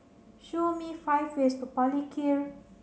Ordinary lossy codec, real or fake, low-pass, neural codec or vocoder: none; real; none; none